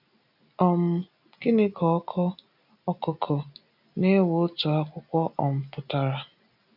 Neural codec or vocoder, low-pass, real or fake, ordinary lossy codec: none; 5.4 kHz; real; none